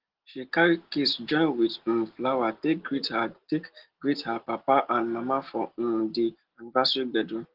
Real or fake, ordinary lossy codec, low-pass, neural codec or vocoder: real; Opus, 16 kbps; 5.4 kHz; none